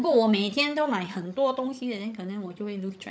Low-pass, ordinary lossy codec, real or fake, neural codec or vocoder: none; none; fake; codec, 16 kHz, 16 kbps, FreqCodec, larger model